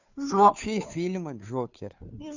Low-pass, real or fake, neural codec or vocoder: 7.2 kHz; fake; codec, 16 kHz, 2 kbps, FunCodec, trained on Chinese and English, 25 frames a second